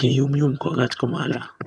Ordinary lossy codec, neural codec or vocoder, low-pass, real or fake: none; vocoder, 22.05 kHz, 80 mel bands, HiFi-GAN; none; fake